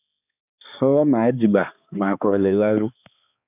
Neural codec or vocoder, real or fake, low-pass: codec, 16 kHz, 2 kbps, X-Codec, HuBERT features, trained on balanced general audio; fake; 3.6 kHz